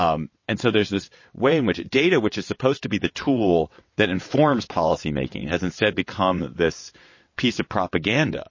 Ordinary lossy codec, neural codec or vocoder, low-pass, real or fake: MP3, 32 kbps; vocoder, 22.05 kHz, 80 mel bands, WaveNeXt; 7.2 kHz; fake